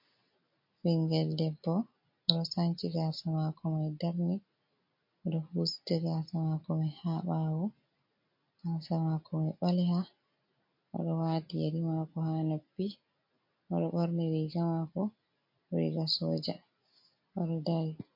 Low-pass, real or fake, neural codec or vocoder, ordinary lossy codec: 5.4 kHz; real; none; MP3, 32 kbps